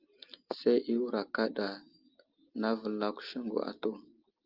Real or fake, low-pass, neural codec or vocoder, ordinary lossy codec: real; 5.4 kHz; none; Opus, 32 kbps